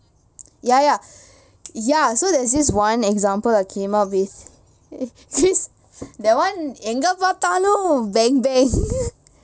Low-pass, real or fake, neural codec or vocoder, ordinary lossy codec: none; real; none; none